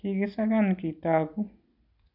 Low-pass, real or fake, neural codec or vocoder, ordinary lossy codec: 5.4 kHz; real; none; none